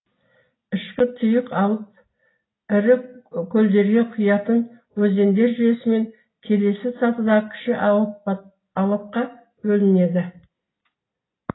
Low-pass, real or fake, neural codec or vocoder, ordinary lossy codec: 7.2 kHz; real; none; AAC, 16 kbps